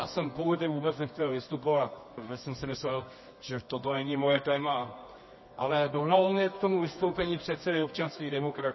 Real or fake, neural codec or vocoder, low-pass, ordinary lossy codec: fake; codec, 24 kHz, 0.9 kbps, WavTokenizer, medium music audio release; 7.2 kHz; MP3, 24 kbps